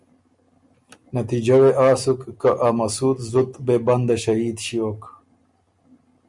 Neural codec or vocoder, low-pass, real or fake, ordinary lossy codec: none; 10.8 kHz; real; Opus, 64 kbps